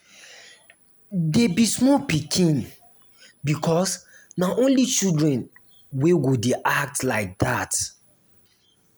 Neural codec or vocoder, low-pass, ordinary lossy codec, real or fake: none; none; none; real